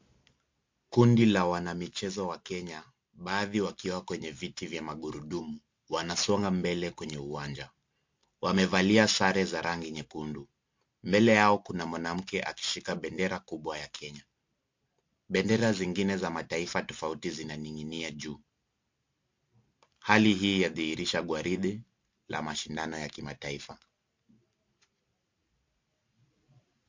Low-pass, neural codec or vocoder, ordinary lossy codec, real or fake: 7.2 kHz; none; MP3, 48 kbps; real